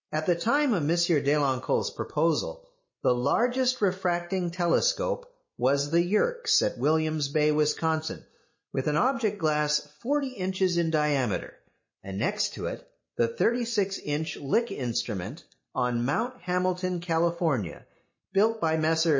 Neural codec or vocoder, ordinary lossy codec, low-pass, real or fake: none; MP3, 32 kbps; 7.2 kHz; real